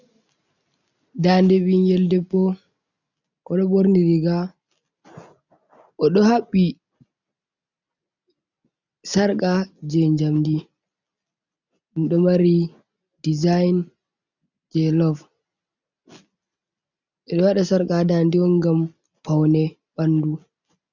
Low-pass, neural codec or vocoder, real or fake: 7.2 kHz; none; real